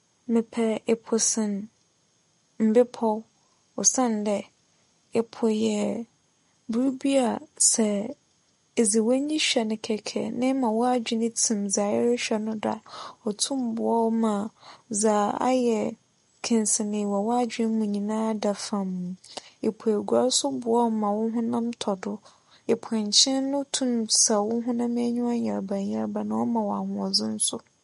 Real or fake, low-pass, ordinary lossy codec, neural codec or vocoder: real; 10.8 kHz; MP3, 48 kbps; none